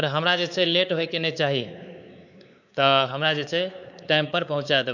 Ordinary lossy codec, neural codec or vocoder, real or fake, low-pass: none; codec, 16 kHz, 4 kbps, X-Codec, WavLM features, trained on Multilingual LibriSpeech; fake; 7.2 kHz